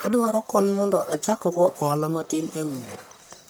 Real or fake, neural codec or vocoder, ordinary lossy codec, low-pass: fake; codec, 44.1 kHz, 1.7 kbps, Pupu-Codec; none; none